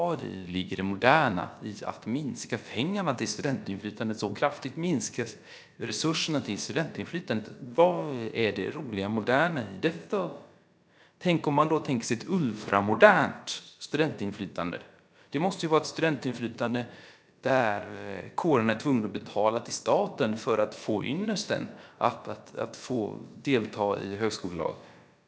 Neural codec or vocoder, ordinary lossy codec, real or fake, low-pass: codec, 16 kHz, about 1 kbps, DyCAST, with the encoder's durations; none; fake; none